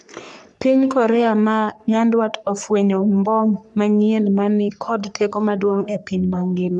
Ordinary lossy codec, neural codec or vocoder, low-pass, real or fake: none; codec, 44.1 kHz, 3.4 kbps, Pupu-Codec; 10.8 kHz; fake